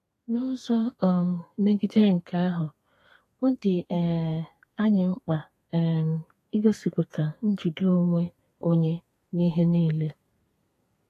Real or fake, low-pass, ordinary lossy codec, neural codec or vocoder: fake; 14.4 kHz; AAC, 48 kbps; codec, 32 kHz, 1.9 kbps, SNAC